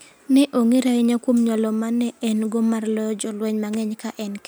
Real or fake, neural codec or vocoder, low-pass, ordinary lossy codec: real; none; none; none